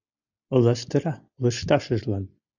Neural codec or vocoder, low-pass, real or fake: none; 7.2 kHz; real